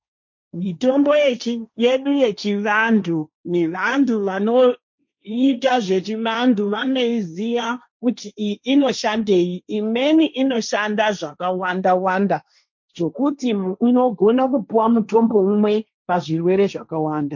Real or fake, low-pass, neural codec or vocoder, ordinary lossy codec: fake; 7.2 kHz; codec, 16 kHz, 1.1 kbps, Voila-Tokenizer; MP3, 48 kbps